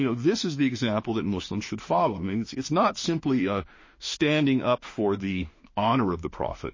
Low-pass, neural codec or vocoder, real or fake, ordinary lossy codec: 7.2 kHz; autoencoder, 48 kHz, 32 numbers a frame, DAC-VAE, trained on Japanese speech; fake; MP3, 32 kbps